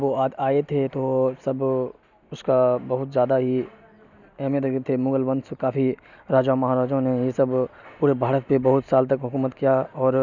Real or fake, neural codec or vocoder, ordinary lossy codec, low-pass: real; none; none; 7.2 kHz